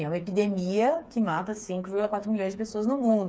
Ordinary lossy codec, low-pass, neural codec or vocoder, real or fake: none; none; codec, 16 kHz, 4 kbps, FreqCodec, smaller model; fake